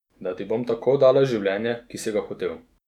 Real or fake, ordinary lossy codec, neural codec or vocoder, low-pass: fake; none; autoencoder, 48 kHz, 128 numbers a frame, DAC-VAE, trained on Japanese speech; 19.8 kHz